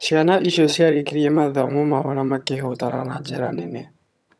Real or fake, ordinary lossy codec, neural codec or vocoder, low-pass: fake; none; vocoder, 22.05 kHz, 80 mel bands, HiFi-GAN; none